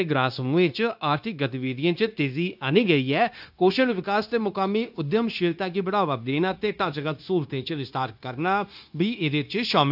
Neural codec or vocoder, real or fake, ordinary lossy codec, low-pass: codec, 16 kHz, 0.9 kbps, LongCat-Audio-Codec; fake; AAC, 48 kbps; 5.4 kHz